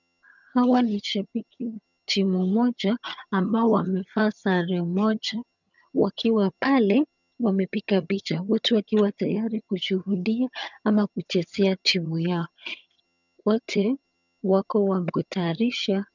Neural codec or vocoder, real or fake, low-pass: vocoder, 22.05 kHz, 80 mel bands, HiFi-GAN; fake; 7.2 kHz